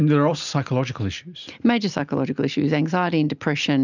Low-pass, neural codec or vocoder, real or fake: 7.2 kHz; none; real